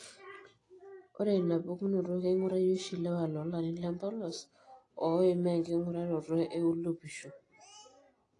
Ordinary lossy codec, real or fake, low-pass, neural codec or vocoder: AAC, 32 kbps; real; 10.8 kHz; none